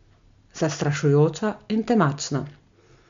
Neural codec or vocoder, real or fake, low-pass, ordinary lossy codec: none; real; 7.2 kHz; MP3, 64 kbps